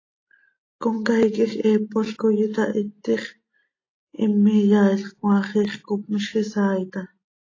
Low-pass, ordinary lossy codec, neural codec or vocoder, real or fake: 7.2 kHz; AAC, 32 kbps; none; real